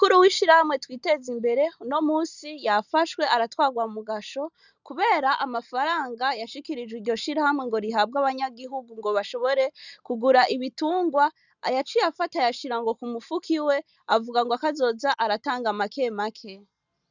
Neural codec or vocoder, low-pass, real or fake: none; 7.2 kHz; real